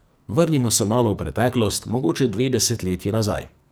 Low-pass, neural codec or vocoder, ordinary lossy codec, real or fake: none; codec, 44.1 kHz, 2.6 kbps, SNAC; none; fake